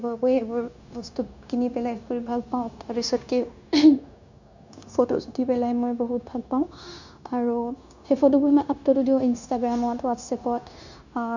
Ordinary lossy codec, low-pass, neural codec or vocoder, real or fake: none; 7.2 kHz; codec, 16 kHz, 0.9 kbps, LongCat-Audio-Codec; fake